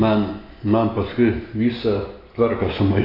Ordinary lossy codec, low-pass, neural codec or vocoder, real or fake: AAC, 24 kbps; 5.4 kHz; none; real